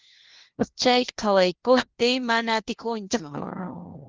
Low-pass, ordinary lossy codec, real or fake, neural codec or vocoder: 7.2 kHz; Opus, 16 kbps; fake; codec, 16 kHz, 1 kbps, FunCodec, trained on LibriTTS, 50 frames a second